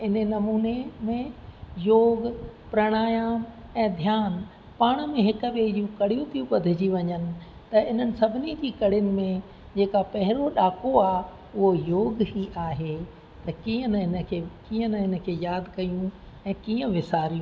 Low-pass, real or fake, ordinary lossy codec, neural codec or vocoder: none; real; none; none